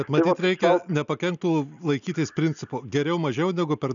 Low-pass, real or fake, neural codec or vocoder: 7.2 kHz; real; none